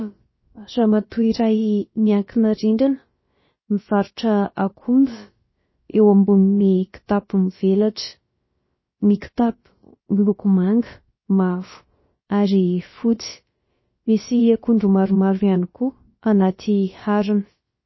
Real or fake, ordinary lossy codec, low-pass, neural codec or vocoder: fake; MP3, 24 kbps; 7.2 kHz; codec, 16 kHz, about 1 kbps, DyCAST, with the encoder's durations